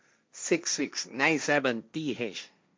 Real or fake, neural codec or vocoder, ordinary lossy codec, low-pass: fake; codec, 16 kHz, 1.1 kbps, Voila-Tokenizer; none; none